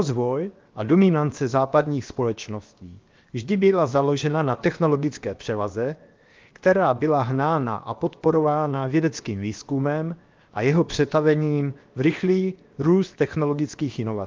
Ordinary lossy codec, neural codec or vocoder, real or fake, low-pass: Opus, 32 kbps; codec, 16 kHz, 0.7 kbps, FocalCodec; fake; 7.2 kHz